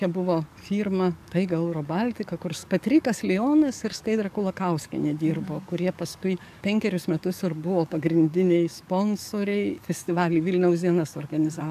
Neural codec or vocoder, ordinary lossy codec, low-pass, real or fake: codec, 44.1 kHz, 7.8 kbps, DAC; AAC, 96 kbps; 14.4 kHz; fake